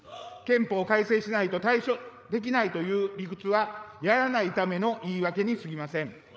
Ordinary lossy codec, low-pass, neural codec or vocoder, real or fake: none; none; codec, 16 kHz, 8 kbps, FreqCodec, larger model; fake